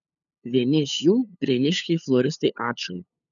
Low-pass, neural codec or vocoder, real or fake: 7.2 kHz; codec, 16 kHz, 8 kbps, FunCodec, trained on LibriTTS, 25 frames a second; fake